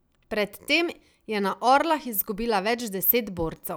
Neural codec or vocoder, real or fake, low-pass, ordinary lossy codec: none; real; none; none